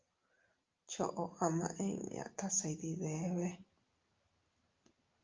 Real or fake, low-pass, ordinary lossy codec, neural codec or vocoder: real; 7.2 kHz; Opus, 24 kbps; none